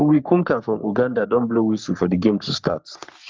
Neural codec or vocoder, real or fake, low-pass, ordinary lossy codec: codec, 44.1 kHz, 3.4 kbps, Pupu-Codec; fake; 7.2 kHz; Opus, 32 kbps